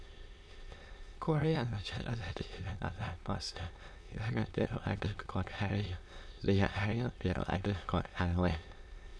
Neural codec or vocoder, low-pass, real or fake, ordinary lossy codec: autoencoder, 22.05 kHz, a latent of 192 numbers a frame, VITS, trained on many speakers; none; fake; none